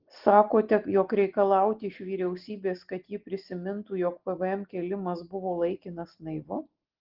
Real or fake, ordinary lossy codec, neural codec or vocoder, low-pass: real; Opus, 16 kbps; none; 5.4 kHz